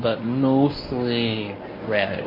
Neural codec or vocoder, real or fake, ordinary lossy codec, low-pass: codec, 24 kHz, 0.9 kbps, WavTokenizer, medium speech release version 1; fake; MP3, 24 kbps; 5.4 kHz